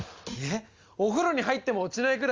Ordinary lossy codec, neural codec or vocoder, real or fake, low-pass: Opus, 32 kbps; none; real; 7.2 kHz